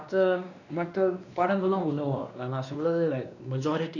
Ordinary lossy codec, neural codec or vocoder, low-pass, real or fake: none; codec, 16 kHz, 2 kbps, X-Codec, WavLM features, trained on Multilingual LibriSpeech; 7.2 kHz; fake